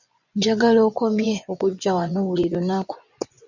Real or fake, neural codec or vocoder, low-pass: fake; vocoder, 24 kHz, 100 mel bands, Vocos; 7.2 kHz